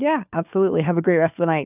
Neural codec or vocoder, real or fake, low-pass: codec, 16 kHz, 4 kbps, FunCodec, trained on LibriTTS, 50 frames a second; fake; 3.6 kHz